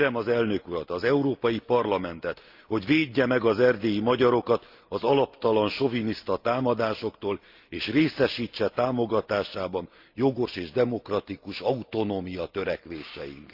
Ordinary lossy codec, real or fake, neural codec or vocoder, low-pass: Opus, 24 kbps; real; none; 5.4 kHz